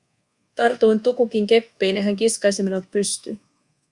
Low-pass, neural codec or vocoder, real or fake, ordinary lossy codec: 10.8 kHz; codec, 24 kHz, 1.2 kbps, DualCodec; fake; Opus, 64 kbps